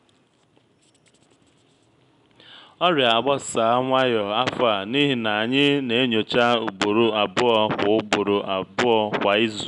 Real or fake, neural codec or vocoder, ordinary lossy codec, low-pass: real; none; none; 10.8 kHz